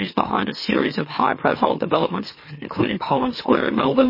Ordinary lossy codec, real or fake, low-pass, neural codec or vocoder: MP3, 24 kbps; fake; 5.4 kHz; autoencoder, 44.1 kHz, a latent of 192 numbers a frame, MeloTTS